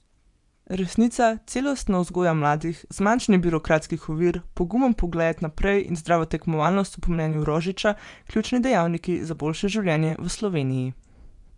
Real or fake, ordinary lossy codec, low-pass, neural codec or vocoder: fake; none; 10.8 kHz; vocoder, 24 kHz, 100 mel bands, Vocos